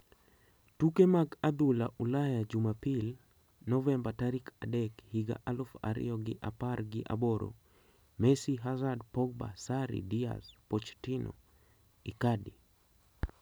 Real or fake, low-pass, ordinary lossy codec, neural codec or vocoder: real; none; none; none